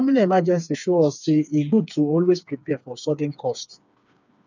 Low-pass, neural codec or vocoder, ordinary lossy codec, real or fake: 7.2 kHz; codec, 16 kHz, 4 kbps, FreqCodec, smaller model; none; fake